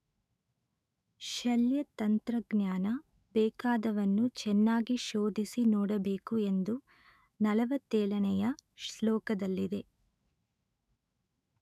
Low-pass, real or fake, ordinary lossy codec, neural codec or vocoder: 14.4 kHz; fake; none; autoencoder, 48 kHz, 128 numbers a frame, DAC-VAE, trained on Japanese speech